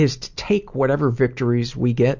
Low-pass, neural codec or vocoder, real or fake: 7.2 kHz; none; real